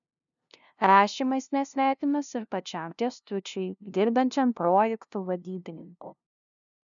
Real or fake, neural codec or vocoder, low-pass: fake; codec, 16 kHz, 0.5 kbps, FunCodec, trained on LibriTTS, 25 frames a second; 7.2 kHz